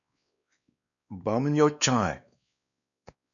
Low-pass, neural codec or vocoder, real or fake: 7.2 kHz; codec, 16 kHz, 2 kbps, X-Codec, WavLM features, trained on Multilingual LibriSpeech; fake